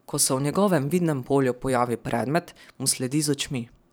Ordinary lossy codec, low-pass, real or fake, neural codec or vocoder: none; none; fake; vocoder, 44.1 kHz, 128 mel bands every 512 samples, BigVGAN v2